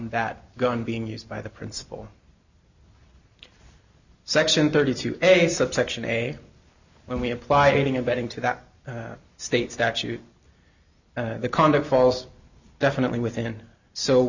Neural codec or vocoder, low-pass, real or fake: none; 7.2 kHz; real